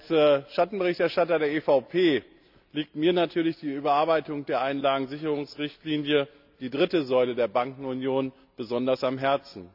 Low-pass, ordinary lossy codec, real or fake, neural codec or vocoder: 5.4 kHz; none; real; none